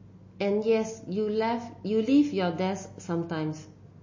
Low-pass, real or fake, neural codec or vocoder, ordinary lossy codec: 7.2 kHz; real; none; MP3, 32 kbps